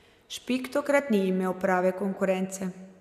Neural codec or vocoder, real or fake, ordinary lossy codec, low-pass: none; real; none; 14.4 kHz